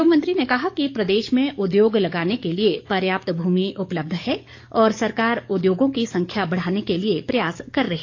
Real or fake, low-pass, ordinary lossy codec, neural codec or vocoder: fake; 7.2 kHz; AAC, 48 kbps; codec, 44.1 kHz, 7.8 kbps, DAC